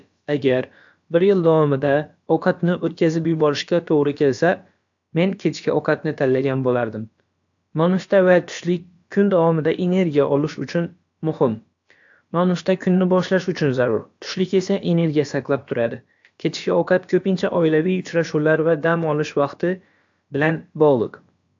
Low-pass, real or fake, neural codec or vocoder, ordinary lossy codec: 7.2 kHz; fake; codec, 16 kHz, about 1 kbps, DyCAST, with the encoder's durations; AAC, 64 kbps